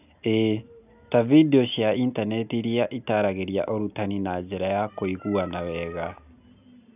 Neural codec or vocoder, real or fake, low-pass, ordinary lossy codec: none; real; 3.6 kHz; none